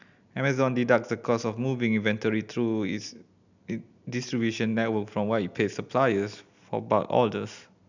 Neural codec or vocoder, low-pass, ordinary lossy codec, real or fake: none; 7.2 kHz; none; real